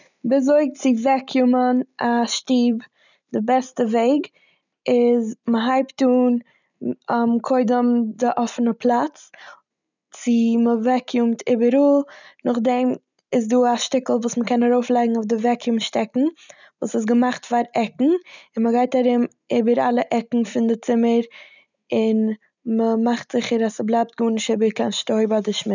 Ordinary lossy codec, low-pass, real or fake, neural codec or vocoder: none; 7.2 kHz; real; none